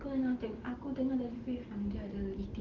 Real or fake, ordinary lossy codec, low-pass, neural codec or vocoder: real; Opus, 16 kbps; 7.2 kHz; none